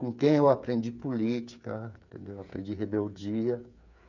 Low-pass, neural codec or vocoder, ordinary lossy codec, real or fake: 7.2 kHz; codec, 16 kHz, 8 kbps, FreqCodec, smaller model; none; fake